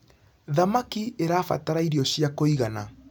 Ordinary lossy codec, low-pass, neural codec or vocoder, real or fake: none; none; none; real